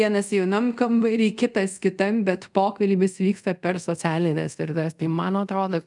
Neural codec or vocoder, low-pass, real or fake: codec, 24 kHz, 0.5 kbps, DualCodec; 10.8 kHz; fake